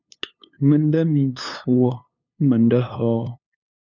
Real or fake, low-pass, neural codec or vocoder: fake; 7.2 kHz; codec, 16 kHz, 2 kbps, FunCodec, trained on LibriTTS, 25 frames a second